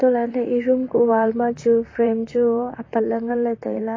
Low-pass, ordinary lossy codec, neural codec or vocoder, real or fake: 7.2 kHz; AAC, 32 kbps; vocoder, 44.1 kHz, 128 mel bands, Pupu-Vocoder; fake